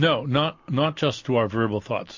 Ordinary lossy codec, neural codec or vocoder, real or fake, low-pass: MP3, 48 kbps; none; real; 7.2 kHz